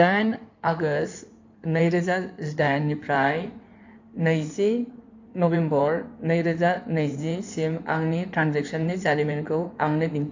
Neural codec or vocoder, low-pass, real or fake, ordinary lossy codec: codec, 16 kHz in and 24 kHz out, 2.2 kbps, FireRedTTS-2 codec; 7.2 kHz; fake; AAC, 48 kbps